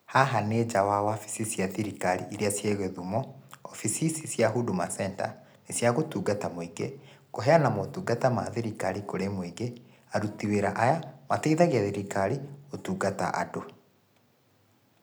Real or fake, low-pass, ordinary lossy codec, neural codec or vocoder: real; none; none; none